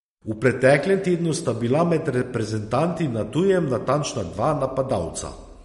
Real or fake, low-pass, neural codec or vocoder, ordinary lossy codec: real; 19.8 kHz; none; MP3, 48 kbps